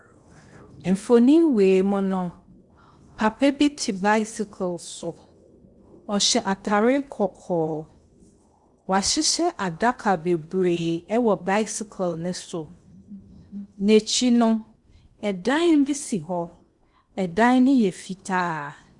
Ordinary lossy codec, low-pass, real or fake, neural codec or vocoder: Opus, 64 kbps; 10.8 kHz; fake; codec, 16 kHz in and 24 kHz out, 0.8 kbps, FocalCodec, streaming, 65536 codes